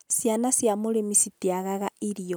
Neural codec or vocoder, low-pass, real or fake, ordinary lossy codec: none; none; real; none